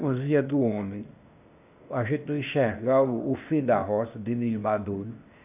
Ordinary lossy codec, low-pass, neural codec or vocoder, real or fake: none; 3.6 kHz; codec, 16 kHz, 0.8 kbps, ZipCodec; fake